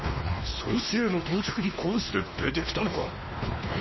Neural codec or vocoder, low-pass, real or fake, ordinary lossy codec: codec, 16 kHz, 2 kbps, X-Codec, WavLM features, trained on Multilingual LibriSpeech; 7.2 kHz; fake; MP3, 24 kbps